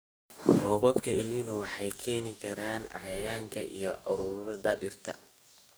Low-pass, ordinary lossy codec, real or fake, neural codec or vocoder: none; none; fake; codec, 44.1 kHz, 2.6 kbps, DAC